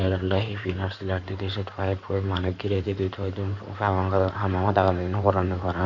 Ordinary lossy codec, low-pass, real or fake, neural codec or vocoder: none; 7.2 kHz; fake; codec, 16 kHz in and 24 kHz out, 2.2 kbps, FireRedTTS-2 codec